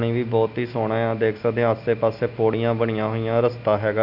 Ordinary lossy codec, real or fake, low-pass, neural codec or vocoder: none; real; 5.4 kHz; none